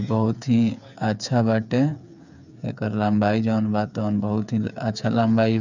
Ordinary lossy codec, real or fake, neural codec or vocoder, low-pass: none; fake; codec, 16 kHz, 8 kbps, FreqCodec, smaller model; 7.2 kHz